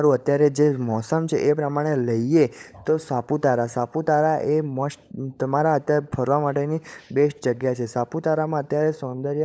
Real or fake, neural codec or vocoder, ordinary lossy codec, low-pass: fake; codec, 16 kHz, 16 kbps, FunCodec, trained on LibriTTS, 50 frames a second; none; none